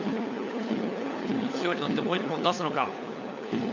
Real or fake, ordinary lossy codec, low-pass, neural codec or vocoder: fake; none; 7.2 kHz; codec, 16 kHz, 4 kbps, FunCodec, trained on LibriTTS, 50 frames a second